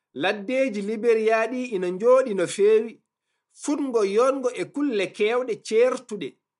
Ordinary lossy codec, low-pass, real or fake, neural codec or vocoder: MP3, 64 kbps; 10.8 kHz; real; none